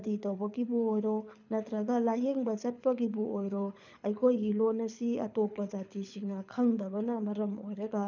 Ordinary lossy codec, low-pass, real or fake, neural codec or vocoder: none; 7.2 kHz; fake; codec, 24 kHz, 6 kbps, HILCodec